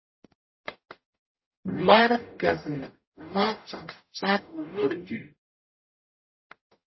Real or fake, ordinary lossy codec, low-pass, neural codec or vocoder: fake; MP3, 24 kbps; 7.2 kHz; codec, 44.1 kHz, 0.9 kbps, DAC